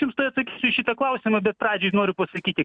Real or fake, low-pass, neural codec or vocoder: real; 9.9 kHz; none